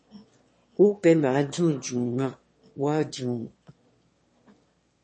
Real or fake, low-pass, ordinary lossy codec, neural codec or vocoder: fake; 9.9 kHz; MP3, 32 kbps; autoencoder, 22.05 kHz, a latent of 192 numbers a frame, VITS, trained on one speaker